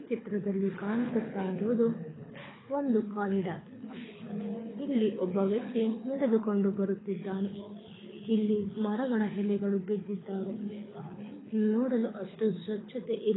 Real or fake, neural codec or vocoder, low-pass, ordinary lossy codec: fake; codec, 24 kHz, 6 kbps, HILCodec; 7.2 kHz; AAC, 16 kbps